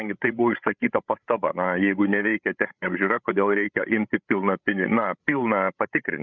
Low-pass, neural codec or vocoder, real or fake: 7.2 kHz; codec, 16 kHz, 16 kbps, FreqCodec, larger model; fake